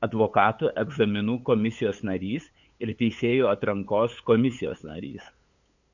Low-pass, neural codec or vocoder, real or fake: 7.2 kHz; codec, 16 kHz, 8 kbps, FunCodec, trained on LibriTTS, 25 frames a second; fake